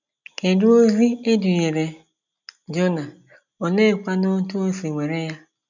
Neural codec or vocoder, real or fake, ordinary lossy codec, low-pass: none; real; none; 7.2 kHz